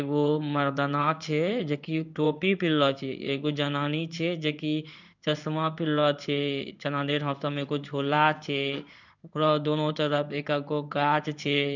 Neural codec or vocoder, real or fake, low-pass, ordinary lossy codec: codec, 16 kHz in and 24 kHz out, 1 kbps, XY-Tokenizer; fake; 7.2 kHz; none